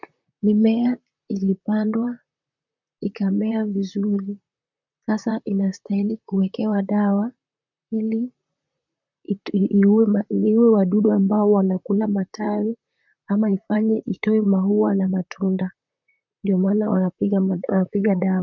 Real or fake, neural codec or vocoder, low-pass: fake; vocoder, 44.1 kHz, 128 mel bands, Pupu-Vocoder; 7.2 kHz